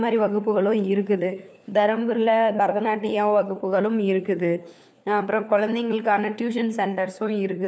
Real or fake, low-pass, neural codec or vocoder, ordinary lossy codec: fake; none; codec, 16 kHz, 4 kbps, FunCodec, trained on LibriTTS, 50 frames a second; none